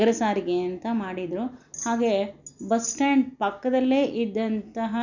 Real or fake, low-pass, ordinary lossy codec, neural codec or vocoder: real; 7.2 kHz; none; none